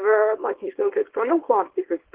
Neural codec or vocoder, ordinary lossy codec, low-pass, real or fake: codec, 24 kHz, 0.9 kbps, WavTokenizer, small release; Opus, 16 kbps; 3.6 kHz; fake